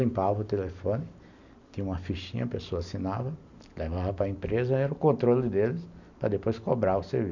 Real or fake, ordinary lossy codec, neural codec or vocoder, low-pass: real; none; none; 7.2 kHz